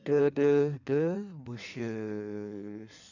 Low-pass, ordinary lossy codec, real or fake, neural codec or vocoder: 7.2 kHz; none; fake; codec, 16 kHz in and 24 kHz out, 1.1 kbps, FireRedTTS-2 codec